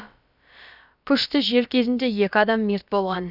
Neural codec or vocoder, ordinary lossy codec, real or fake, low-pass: codec, 16 kHz, about 1 kbps, DyCAST, with the encoder's durations; none; fake; 5.4 kHz